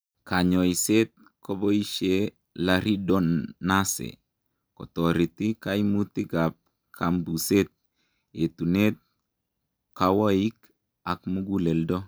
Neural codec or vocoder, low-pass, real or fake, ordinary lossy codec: none; none; real; none